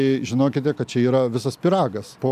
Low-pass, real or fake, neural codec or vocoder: 14.4 kHz; real; none